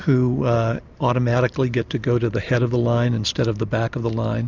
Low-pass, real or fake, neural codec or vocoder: 7.2 kHz; real; none